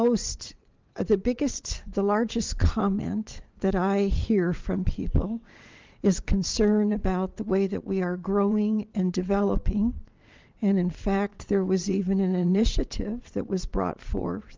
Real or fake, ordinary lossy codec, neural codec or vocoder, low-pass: fake; Opus, 32 kbps; vocoder, 22.05 kHz, 80 mel bands, WaveNeXt; 7.2 kHz